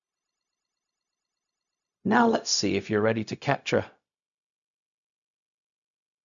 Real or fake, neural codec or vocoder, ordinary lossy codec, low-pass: fake; codec, 16 kHz, 0.4 kbps, LongCat-Audio-Codec; none; 7.2 kHz